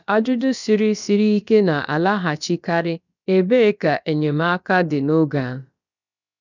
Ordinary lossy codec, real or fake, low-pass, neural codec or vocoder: none; fake; 7.2 kHz; codec, 16 kHz, about 1 kbps, DyCAST, with the encoder's durations